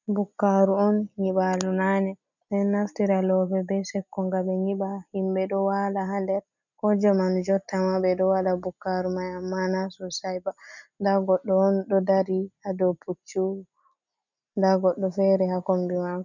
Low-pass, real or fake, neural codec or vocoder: 7.2 kHz; real; none